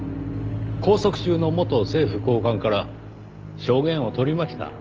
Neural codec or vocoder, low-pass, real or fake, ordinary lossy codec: none; 7.2 kHz; real; Opus, 24 kbps